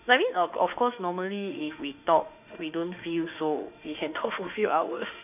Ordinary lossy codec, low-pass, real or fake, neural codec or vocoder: none; 3.6 kHz; fake; autoencoder, 48 kHz, 32 numbers a frame, DAC-VAE, trained on Japanese speech